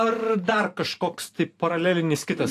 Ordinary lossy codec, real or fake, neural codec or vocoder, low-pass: AAC, 64 kbps; fake; vocoder, 44.1 kHz, 128 mel bands every 512 samples, BigVGAN v2; 14.4 kHz